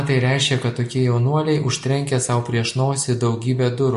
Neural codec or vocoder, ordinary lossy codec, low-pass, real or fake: none; MP3, 48 kbps; 14.4 kHz; real